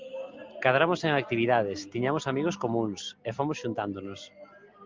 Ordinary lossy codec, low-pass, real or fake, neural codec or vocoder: Opus, 24 kbps; 7.2 kHz; real; none